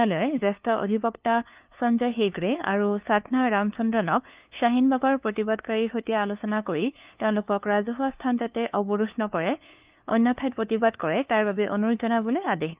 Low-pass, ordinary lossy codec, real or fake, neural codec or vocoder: 3.6 kHz; Opus, 32 kbps; fake; codec, 16 kHz, 2 kbps, FunCodec, trained on LibriTTS, 25 frames a second